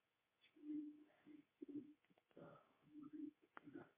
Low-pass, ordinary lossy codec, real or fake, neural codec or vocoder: 3.6 kHz; MP3, 24 kbps; fake; codec, 24 kHz, 0.9 kbps, WavTokenizer, medium speech release version 2